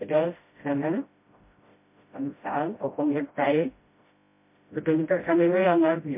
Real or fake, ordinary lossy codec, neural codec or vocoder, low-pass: fake; MP3, 24 kbps; codec, 16 kHz, 0.5 kbps, FreqCodec, smaller model; 3.6 kHz